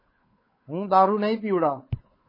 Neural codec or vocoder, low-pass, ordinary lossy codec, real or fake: codec, 16 kHz, 8 kbps, FunCodec, trained on Chinese and English, 25 frames a second; 5.4 kHz; MP3, 24 kbps; fake